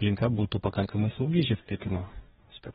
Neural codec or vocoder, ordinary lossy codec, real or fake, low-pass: codec, 44.1 kHz, 2.6 kbps, DAC; AAC, 16 kbps; fake; 19.8 kHz